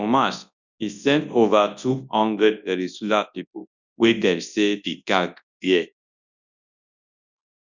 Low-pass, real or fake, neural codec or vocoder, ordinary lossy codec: 7.2 kHz; fake; codec, 24 kHz, 0.9 kbps, WavTokenizer, large speech release; none